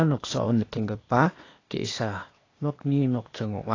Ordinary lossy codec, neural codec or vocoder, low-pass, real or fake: AAC, 32 kbps; codec, 16 kHz, 0.8 kbps, ZipCodec; 7.2 kHz; fake